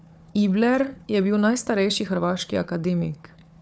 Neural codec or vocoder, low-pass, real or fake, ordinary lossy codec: codec, 16 kHz, 4 kbps, FunCodec, trained on Chinese and English, 50 frames a second; none; fake; none